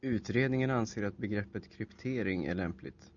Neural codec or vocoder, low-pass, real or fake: none; 7.2 kHz; real